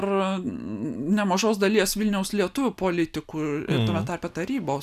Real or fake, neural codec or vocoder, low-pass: real; none; 14.4 kHz